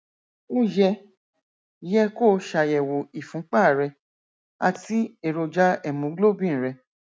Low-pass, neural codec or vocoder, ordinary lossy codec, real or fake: none; none; none; real